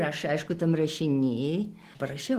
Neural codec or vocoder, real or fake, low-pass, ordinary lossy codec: none; real; 14.4 kHz; Opus, 16 kbps